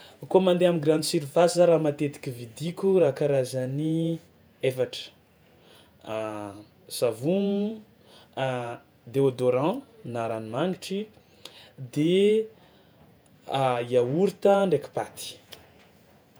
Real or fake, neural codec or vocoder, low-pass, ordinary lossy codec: fake; vocoder, 48 kHz, 128 mel bands, Vocos; none; none